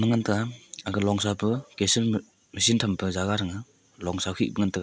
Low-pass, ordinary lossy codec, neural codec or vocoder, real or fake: none; none; none; real